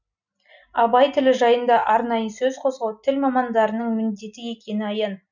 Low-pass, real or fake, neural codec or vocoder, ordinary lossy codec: 7.2 kHz; real; none; none